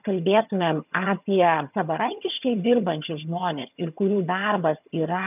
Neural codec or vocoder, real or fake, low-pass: vocoder, 22.05 kHz, 80 mel bands, HiFi-GAN; fake; 3.6 kHz